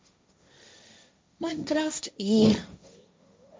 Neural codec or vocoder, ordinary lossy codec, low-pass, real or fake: codec, 16 kHz, 1.1 kbps, Voila-Tokenizer; none; none; fake